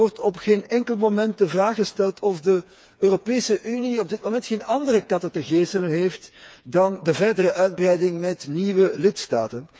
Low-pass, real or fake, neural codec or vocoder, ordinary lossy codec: none; fake; codec, 16 kHz, 4 kbps, FreqCodec, smaller model; none